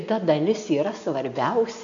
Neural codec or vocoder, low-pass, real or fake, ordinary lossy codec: none; 7.2 kHz; real; AAC, 64 kbps